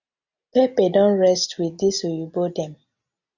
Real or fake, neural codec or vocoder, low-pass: real; none; 7.2 kHz